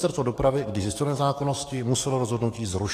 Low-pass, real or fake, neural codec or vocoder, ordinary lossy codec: 14.4 kHz; fake; codec, 44.1 kHz, 7.8 kbps, DAC; AAC, 48 kbps